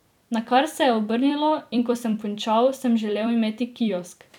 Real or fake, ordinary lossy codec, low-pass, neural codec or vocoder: fake; none; 19.8 kHz; vocoder, 44.1 kHz, 128 mel bands every 256 samples, BigVGAN v2